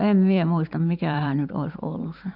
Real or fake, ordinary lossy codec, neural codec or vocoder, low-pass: fake; none; vocoder, 44.1 kHz, 128 mel bands every 512 samples, BigVGAN v2; 5.4 kHz